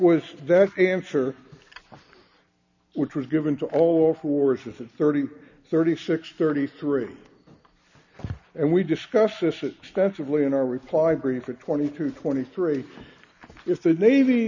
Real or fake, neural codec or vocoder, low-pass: real; none; 7.2 kHz